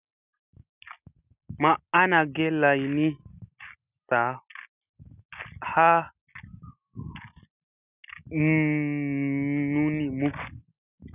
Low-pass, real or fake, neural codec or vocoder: 3.6 kHz; real; none